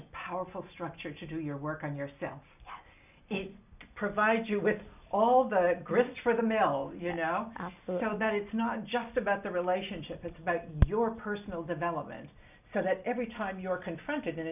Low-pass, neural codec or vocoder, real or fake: 3.6 kHz; none; real